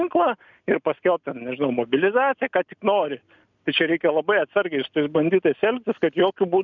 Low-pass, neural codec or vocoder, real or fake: 7.2 kHz; none; real